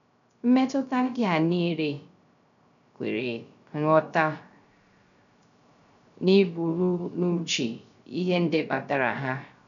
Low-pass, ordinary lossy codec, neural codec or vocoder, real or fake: 7.2 kHz; none; codec, 16 kHz, 0.3 kbps, FocalCodec; fake